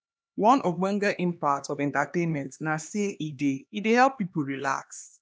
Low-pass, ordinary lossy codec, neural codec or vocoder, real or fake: none; none; codec, 16 kHz, 2 kbps, X-Codec, HuBERT features, trained on LibriSpeech; fake